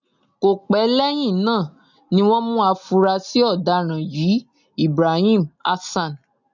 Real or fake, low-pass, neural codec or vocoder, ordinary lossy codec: real; 7.2 kHz; none; none